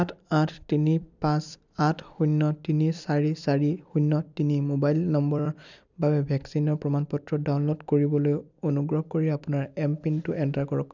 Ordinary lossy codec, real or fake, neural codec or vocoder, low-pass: none; fake; vocoder, 44.1 kHz, 128 mel bands every 256 samples, BigVGAN v2; 7.2 kHz